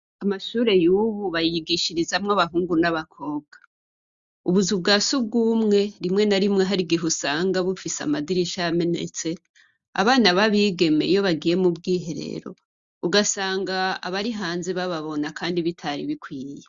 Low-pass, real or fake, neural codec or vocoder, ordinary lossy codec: 7.2 kHz; real; none; Opus, 64 kbps